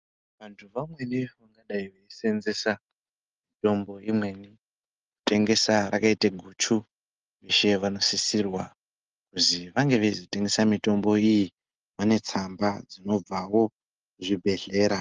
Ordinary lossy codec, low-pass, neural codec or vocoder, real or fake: Opus, 32 kbps; 7.2 kHz; none; real